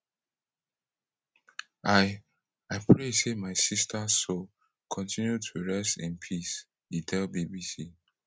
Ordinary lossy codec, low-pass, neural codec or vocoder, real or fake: none; none; none; real